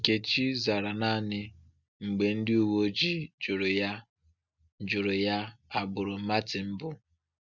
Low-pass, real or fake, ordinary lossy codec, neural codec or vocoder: 7.2 kHz; real; none; none